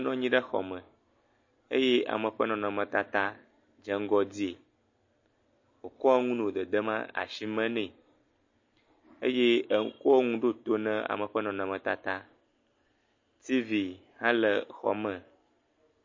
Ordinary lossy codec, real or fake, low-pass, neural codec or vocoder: MP3, 32 kbps; real; 7.2 kHz; none